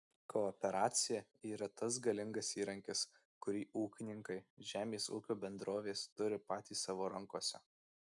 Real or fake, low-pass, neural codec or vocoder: real; 10.8 kHz; none